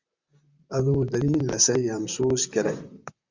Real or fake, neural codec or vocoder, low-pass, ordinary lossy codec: fake; vocoder, 44.1 kHz, 128 mel bands, Pupu-Vocoder; 7.2 kHz; Opus, 64 kbps